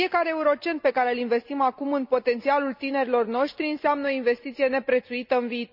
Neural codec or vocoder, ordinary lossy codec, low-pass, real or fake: none; none; 5.4 kHz; real